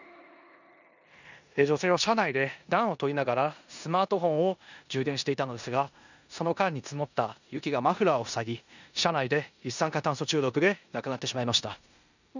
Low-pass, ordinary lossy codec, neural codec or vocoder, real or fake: 7.2 kHz; none; codec, 16 kHz in and 24 kHz out, 0.9 kbps, LongCat-Audio-Codec, four codebook decoder; fake